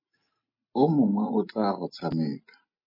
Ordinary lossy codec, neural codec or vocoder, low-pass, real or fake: MP3, 24 kbps; none; 5.4 kHz; real